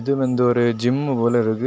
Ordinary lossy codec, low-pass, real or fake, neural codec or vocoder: none; none; real; none